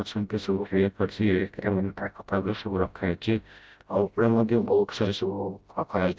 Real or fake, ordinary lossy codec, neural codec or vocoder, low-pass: fake; none; codec, 16 kHz, 0.5 kbps, FreqCodec, smaller model; none